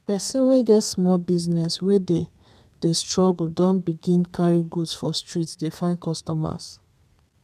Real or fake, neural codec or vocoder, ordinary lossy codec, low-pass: fake; codec, 32 kHz, 1.9 kbps, SNAC; none; 14.4 kHz